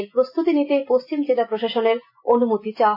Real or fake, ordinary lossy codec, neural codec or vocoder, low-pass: real; MP3, 24 kbps; none; 5.4 kHz